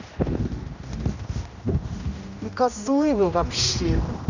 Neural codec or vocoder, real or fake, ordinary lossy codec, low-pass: codec, 16 kHz, 1 kbps, X-Codec, HuBERT features, trained on general audio; fake; none; 7.2 kHz